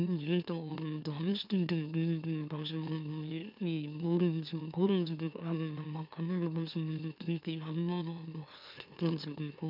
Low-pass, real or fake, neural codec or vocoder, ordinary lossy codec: 5.4 kHz; fake; autoencoder, 44.1 kHz, a latent of 192 numbers a frame, MeloTTS; none